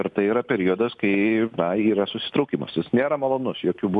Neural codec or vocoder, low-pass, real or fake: none; 10.8 kHz; real